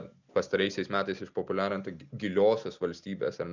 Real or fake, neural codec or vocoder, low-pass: real; none; 7.2 kHz